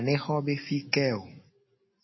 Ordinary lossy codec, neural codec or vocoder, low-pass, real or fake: MP3, 24 kbps; none; 7.2 kHz; real